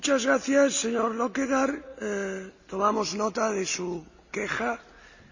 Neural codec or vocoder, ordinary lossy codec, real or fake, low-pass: none; none; real; 7.2 kHz